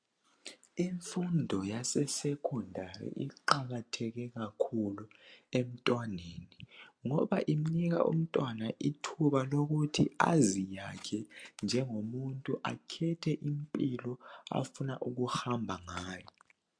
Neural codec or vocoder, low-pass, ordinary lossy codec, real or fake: none; 9.9 kHz; MP3, 64 kbps; real